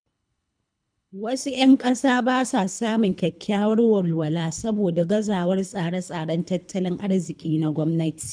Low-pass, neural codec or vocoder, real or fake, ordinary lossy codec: 9.9 kHz; codec, 24 kHz, 3 kbps, HILCodec; fake; none